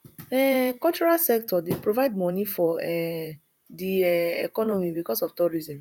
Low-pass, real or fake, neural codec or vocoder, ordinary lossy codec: 19.8 kHz; fake; vocoder, 44.1 kHz, 128 mel bands, Pupu-Vocoder; none